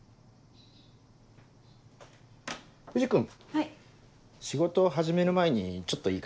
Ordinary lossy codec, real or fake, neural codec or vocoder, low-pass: none; real; none; none